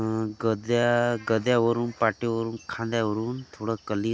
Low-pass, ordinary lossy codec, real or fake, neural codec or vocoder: none; none; real; none